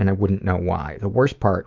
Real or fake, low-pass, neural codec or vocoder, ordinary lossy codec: fake; 7.2 kHz; autoencoder, 48 kHz, 128 numbers a frame, DAC-VAE, trained on Japanese speech; Opus, 32 kbps